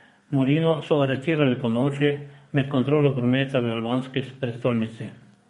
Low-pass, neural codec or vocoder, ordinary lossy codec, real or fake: 14.4 kHz; codec, 32 kHz, 1.9 kbps, SNAC; MP3, 48 kbps; fake